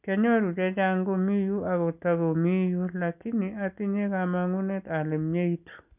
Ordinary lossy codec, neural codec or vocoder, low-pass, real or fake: none; none; 3.6 kHz; real